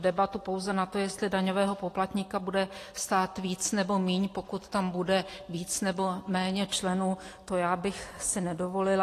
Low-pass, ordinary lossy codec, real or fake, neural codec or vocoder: 14.4 kHz; AAC, 48 kbps; real; none